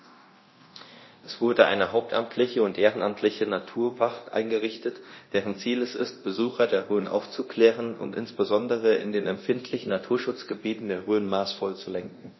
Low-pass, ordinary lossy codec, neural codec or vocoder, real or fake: 7.2 kHz; MP3, 24 kbps; codec, 24 kHz, 0.9 kbps, DualCodec; fake